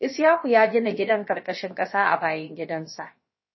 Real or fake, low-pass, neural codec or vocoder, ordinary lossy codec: fake; 7.2 kHz; codec, 16 kHz, about 1 kbps, DyCAST, with the encoder's durations; MP3, 24 kbps